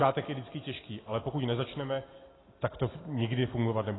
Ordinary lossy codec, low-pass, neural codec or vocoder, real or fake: AAC, 16 kbps; 7.2 kHz; none; real